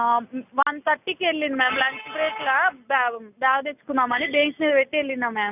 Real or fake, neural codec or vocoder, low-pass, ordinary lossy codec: real; none; 3.6 kHz; none